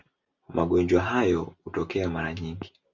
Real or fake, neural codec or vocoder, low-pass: real; none; 7.2 kHz